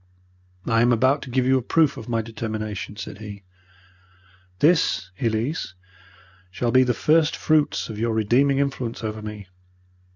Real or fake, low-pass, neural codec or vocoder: real; 7.2 kHz; none